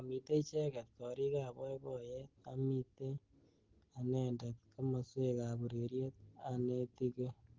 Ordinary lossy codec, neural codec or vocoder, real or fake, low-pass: Opus, 16 kbps; none; real; 7.2 kHz